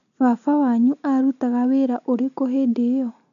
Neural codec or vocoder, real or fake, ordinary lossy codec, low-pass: none; real; none; 7.2 kHz